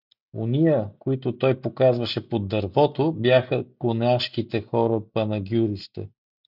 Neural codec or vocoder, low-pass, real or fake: none; 5.4 kHz; real